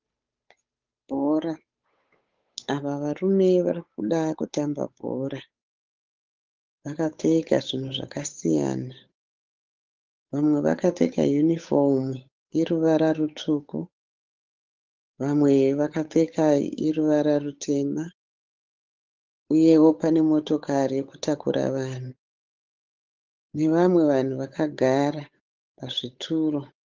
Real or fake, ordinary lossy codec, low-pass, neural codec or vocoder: fake; Opus, 32 kbps; 7.2 kHz; codec, 16 kHz, 8 kbps, FunCodec, trained on Chinese and English, 25 frames a second